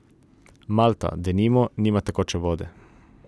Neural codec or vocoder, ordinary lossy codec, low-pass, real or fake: none; none; none; real